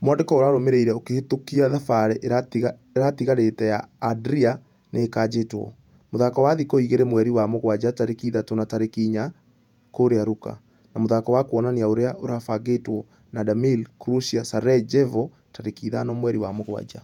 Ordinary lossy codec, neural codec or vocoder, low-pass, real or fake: none; vocoder, 48 kHz, 128 mel bands, Vocos; 19.8 kHz; fake